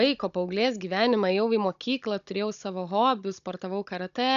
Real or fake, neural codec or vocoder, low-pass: fake; codec, 16 kHz, 16 kbps, FunCodec, trained on Chinese and English, 50 frames a second; 7.2 kHz